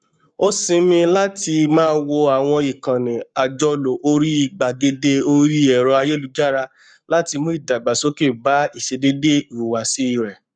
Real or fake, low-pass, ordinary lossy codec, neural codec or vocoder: fake; 9.9 kHz; none; codec, 44.1 kHz, 7.8 kbps, Pupu-Codec